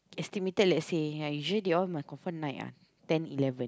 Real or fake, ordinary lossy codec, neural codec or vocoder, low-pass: real; none; none; none